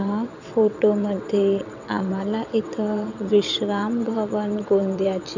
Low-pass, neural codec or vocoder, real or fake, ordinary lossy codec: 7.2 kHz; vocoder, 22.05 kHz, 80 mel bands, WaveNeXt; fake; none